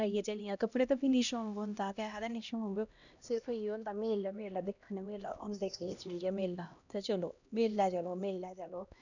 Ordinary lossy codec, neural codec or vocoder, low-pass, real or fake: none; codec, 16 kHz, 1 kbps, X-Codec, HuBERT features, trained on LibriSpeech; 7.2 kHz; fake